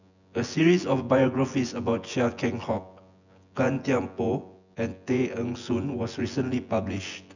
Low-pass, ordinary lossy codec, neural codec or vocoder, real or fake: 7.2 kHz; none; vocoder, 24 kHz, 100 mel bands, Vocos; fake